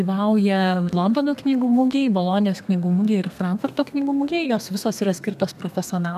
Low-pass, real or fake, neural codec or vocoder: 14.4 kHz; fake; codec, 32 kHz, 1.9 kbps, SNAC